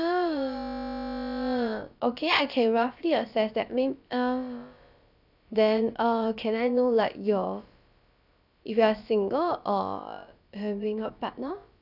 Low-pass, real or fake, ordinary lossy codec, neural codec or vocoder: 5.4 kHz; fake; none; codec, 16 kHz, about 1 kbps, DyCAST, with the encoder's durations